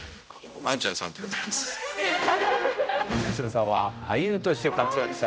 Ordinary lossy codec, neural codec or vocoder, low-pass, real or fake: none; codec, 16 kHz, 0.5 kbps, X-Codec, HuBERT features, trained on general audio; none; fake